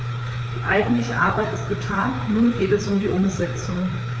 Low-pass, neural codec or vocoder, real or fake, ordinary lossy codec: none; codec, 16 kHz, 4 kbps, FreqCodec, larger model; fake; none